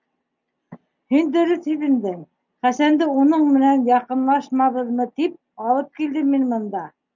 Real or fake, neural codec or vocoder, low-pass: real; none; 7.2 kHz